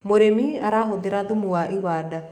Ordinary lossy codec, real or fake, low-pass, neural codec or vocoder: none; fake; 19.8 kHz; codec, 44.1 kHz, 7.8 kbps, DAC